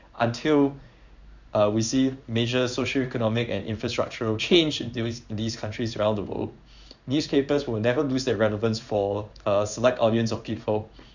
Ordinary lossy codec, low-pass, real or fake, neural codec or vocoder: none; 7.2 kHz; fake; codec, 16 kHz in and 24 kHz out, 1 kbps, XY-Tokenizer